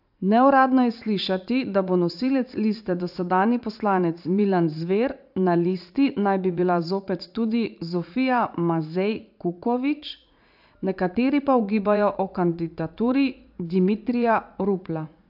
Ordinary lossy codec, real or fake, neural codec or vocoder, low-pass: AAC, 48 kbps; fake; vocoder, 44.1 kHz, 80 mel bands, Vocos; 5.4 kHz